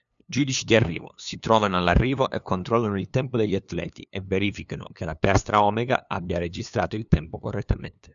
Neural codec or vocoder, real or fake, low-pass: codec, 16 kHz, 2 kbps, FunCodec, trained on LibriTTS, 25 frames a second; fake; 7.2 kHz